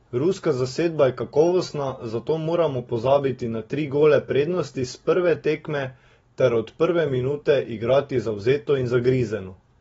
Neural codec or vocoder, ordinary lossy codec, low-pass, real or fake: none; AAC, 24 kbps; 19.8 kHz; real